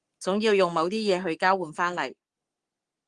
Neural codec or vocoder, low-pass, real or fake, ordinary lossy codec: codec, 44.1 kHz, 7.8 kbps, Pupu-Codec; 10.8 kHz; fake; Opus, 24 kbps